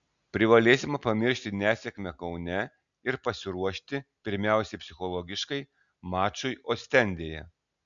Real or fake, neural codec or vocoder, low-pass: real; none; 7.2 kHz